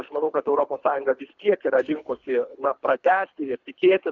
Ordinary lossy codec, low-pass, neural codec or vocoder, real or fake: Opus, 64 kbps; 7.2 kHz; codec, 24 kHz, 3 kbps, HILCodec; fake